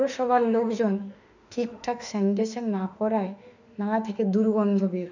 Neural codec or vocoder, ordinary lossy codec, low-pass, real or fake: autoencoder, 48 kHz, 32 numbers a frame, DAC-VAE, trained on Japanese speech; none; 7.2 kHz; fake